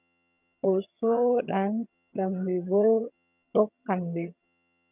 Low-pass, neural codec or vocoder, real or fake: 3.6 kHz; vocoder, 22.05 kHz, 80 mel bands, HiFi-GAN; fake